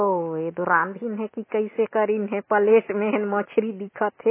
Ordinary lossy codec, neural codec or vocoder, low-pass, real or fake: MP3, 16 kbps; none; 3.6 kHz; real